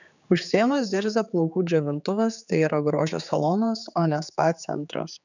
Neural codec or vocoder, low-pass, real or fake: codec, 16 kHz, 4 kbps, X-Codec, HuBERT features, trained on general audio; 7.2 kHz; fake